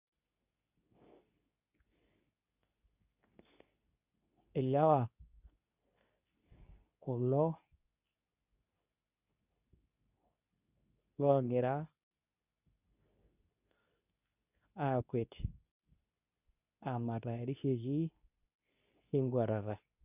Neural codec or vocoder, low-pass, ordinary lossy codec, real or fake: codec, 24 kHz, 0.9 kbps, WavTokenizer, medium speech release version 2; 3.6 kHz; none; fake